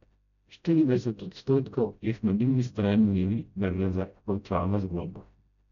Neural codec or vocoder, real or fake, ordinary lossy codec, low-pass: codec, 16 kHz, 0.5 kbps, FreqCodec, smaller model; fake; none; 7.2 kHz